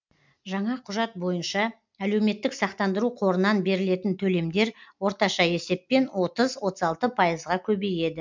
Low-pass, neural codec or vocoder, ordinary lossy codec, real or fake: 7.2 kHz; none; MP3, 64 kbps; real